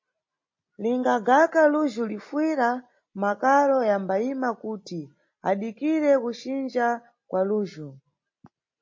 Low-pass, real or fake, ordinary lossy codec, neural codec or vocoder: 7.2 kHz; real; MP3, 32 kbps; none